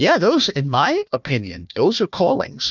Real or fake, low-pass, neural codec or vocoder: fake; 7.2 kHz; codec, 16 kHz, 1 kbps, FunCodec, trained on Chinese and English, 50 frames a second